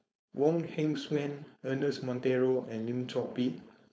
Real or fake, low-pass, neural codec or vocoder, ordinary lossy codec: fake; none; codec, 16 kHz, 4.8 kbps, FACodec; none